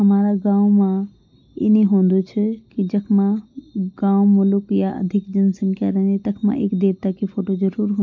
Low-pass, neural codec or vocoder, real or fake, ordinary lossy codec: 7.2 kHz; none; real; MP3, 48 kbps